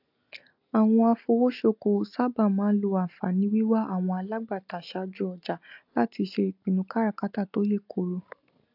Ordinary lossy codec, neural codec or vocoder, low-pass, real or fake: AAC, 48 kbps; none; 5.4 kHz; real